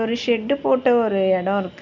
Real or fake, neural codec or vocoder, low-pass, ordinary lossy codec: real; none; 7.2 kHz; none